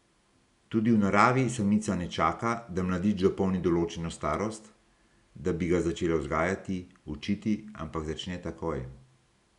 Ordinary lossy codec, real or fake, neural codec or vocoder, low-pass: none; real; none; 10.8 kHz